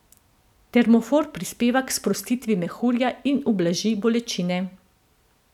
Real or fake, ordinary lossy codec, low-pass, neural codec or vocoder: real; none; 19.8 kHz; none